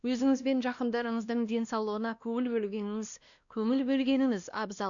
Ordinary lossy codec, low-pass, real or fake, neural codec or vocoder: none; 7.2 kHz; fake; codec, 16 kHz, 1 kbps, X-Codec, WavLM features, trained on Multilingual LibriSpeech